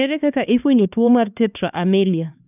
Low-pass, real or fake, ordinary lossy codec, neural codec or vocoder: 3.6 kHz; fake; none; codec, 16 kHz, 2 kbps, X-Codec, HuBERT features, trained on LibriSpeech